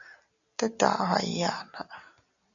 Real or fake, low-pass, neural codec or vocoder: real; 7.2 kHz; none